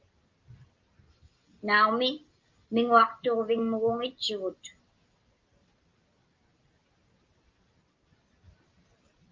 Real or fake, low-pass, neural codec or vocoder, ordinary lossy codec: real; 7.2 kHz; none; Opus, 32 kbps